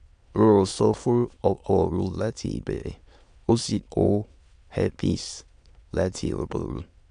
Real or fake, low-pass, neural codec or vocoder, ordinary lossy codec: fake; 9.9 kHz; autoencoder, 22.05 kHz, a latent of 192 numbers a frame, VITS, trained on many speakers; AAC, 64 kbps